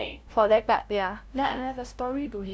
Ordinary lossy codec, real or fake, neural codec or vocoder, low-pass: none; fake; codec, 16 kHz, 0.5 kbps, FunCodec, trained on LibriTTS, 25 frames a second; none